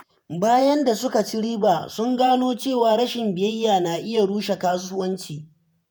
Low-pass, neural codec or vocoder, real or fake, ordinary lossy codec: none; vocoder, 48 kHz, 128 mel bands, Vocos; fake; none